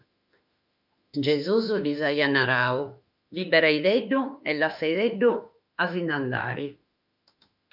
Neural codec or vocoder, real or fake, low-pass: autoencoder, 48 kHz, 32 numbers a frame, DAC-VAE, trained on Japanese speech; fake; 5.4 kHz